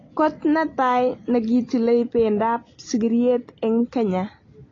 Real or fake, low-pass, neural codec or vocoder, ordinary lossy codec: real; 7.2 kHz; none; AAC, 32 kbps